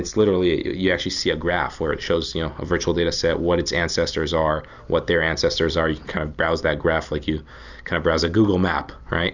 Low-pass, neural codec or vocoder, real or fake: 7.2 kHz; none; real